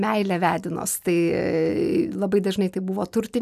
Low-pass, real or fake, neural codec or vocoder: 14.4 kHz; real; none